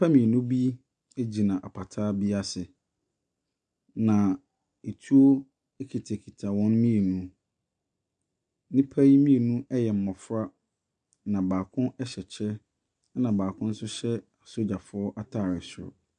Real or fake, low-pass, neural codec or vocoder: real; 9.9 kHz; none